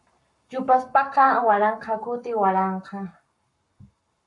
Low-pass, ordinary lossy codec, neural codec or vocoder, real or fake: 10.8 kHz; MP3, 64 kbps; codec, 44.1 kHz, 7.8 kbps, Pupu-Codec; fake